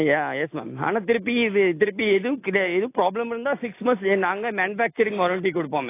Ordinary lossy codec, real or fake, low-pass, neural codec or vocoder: AAC, 24 kbps; real; 3.6 kHz; none